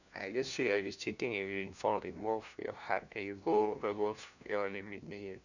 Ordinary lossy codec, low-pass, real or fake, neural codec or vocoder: none; 7.2 kHz; fake; codec, 16 kHz, 1 kbps, FunCodec, trained on LibriTTS, 50 frames a second